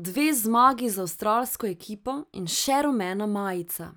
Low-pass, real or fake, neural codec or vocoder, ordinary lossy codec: none; real; none; none